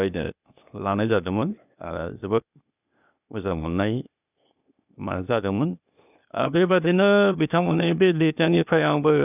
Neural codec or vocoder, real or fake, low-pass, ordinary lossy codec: codec, 16 kHz, 0.8 kbps, ZipCodec; fake; 3.6 kHz; none